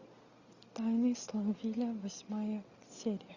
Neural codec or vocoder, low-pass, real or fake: none; 7.2 kHz; real